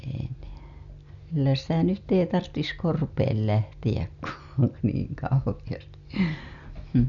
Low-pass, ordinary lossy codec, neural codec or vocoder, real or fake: 7.2 kHz; none; none; real